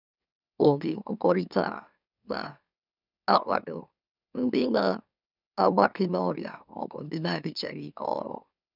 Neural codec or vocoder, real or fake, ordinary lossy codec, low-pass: autoencoder, 44.1 kHz, a latent of 192 numbers a frame, MeloTTS; fake; none; 5.4 kHz